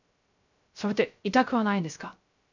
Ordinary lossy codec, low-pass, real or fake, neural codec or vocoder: none; 7.2 kHz; fake; codec, 16 kHz, 0.3 kbps, FocalCodec